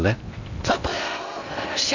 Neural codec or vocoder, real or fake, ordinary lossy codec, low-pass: codec, 16 kHz in and 24 kHz out, 0.6 kbps, FocalCodec, streaming, 2048 codes; fake; none; 7.2 kHz